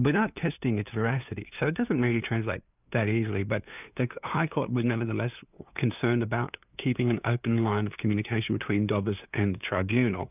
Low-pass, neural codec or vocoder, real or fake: 3.6 kHz; codec, 16 kHz, 2 kbps, FunCodec, trained on LibriTTS, 25 frames a second; fake